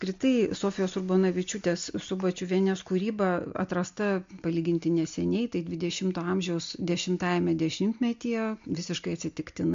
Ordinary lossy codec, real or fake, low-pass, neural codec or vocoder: MP3, 48 kbps; real; 7.2 kHz; none